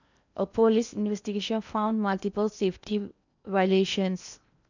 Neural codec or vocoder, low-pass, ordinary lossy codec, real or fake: codec, 16 kHz in and 24 kHz out, 0.8 kbps, FocalCodec, streaming, 65536 codes; 7.2 kHz; none; fake